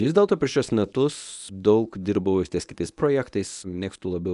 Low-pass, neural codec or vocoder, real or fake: 10.8 kHz; codec, 24 kHz, 0.9 kbps, WavTokenizer, medium speech release version 1; fake